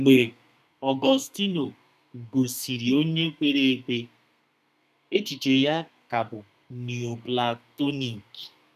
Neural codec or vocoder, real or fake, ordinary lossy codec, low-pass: codec, 32 kHz, 1.9 kbps, SNAC; fake; none; 14.4 kHz